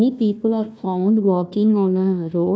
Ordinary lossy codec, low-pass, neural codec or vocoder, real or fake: none; none; codec, 16 kHz, 1 kbps, FunCodec, trained on Chinese and English, 50 frames a second; fake